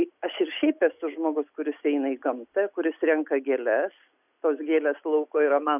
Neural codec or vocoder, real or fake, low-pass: none; real; 3.6 kHz